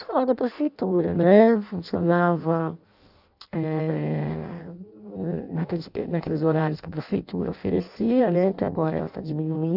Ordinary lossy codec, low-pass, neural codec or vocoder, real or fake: none; 5.4 kHz; codec, 16 kHz in and 24 kHz out, 0.6 kbps, FireRedTTS-2 codec; fake